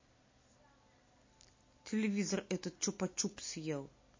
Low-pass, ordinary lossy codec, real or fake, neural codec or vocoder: 7.2 kHz; MP3, 32 kbps; real; none